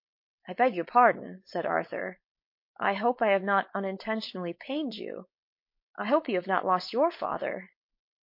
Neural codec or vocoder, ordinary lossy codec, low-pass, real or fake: codec, 16 kHz, 4.8 kbps, FACodec; MP3, 32 kbps; 5.4 kHz; fake